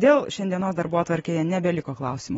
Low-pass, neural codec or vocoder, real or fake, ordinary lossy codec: 14.4 kHz; none; real; AAC, 24 kbps